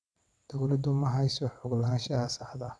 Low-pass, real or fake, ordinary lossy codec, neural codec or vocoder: none; fake; none; vocoder, 22.05 kHz, 80 mel bands, WaveNeXt